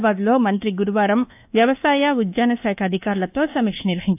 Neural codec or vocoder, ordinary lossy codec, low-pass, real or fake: codec, 24 kHz, 1.2 kbps, DualCodec; AAC, 32 kbps; 3.6 kHz; fake